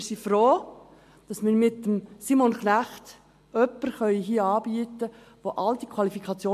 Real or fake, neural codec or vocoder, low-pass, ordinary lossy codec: real; none; 14.4 kHz; MP3, 64 kbps